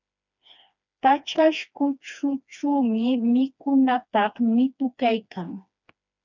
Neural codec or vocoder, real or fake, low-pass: codec, 16 kHz, 2 kbps, FreqCodec, smaller model; fake; 7.2 kHz